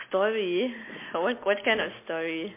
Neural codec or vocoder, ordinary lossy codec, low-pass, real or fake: none; MP3, 24 kbps; 3.6 kHz; real